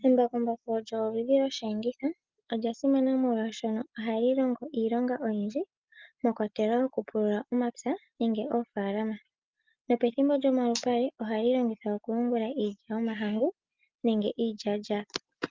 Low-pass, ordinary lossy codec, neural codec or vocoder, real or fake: 7.2 kHz; Opus, 32 kbps; none; real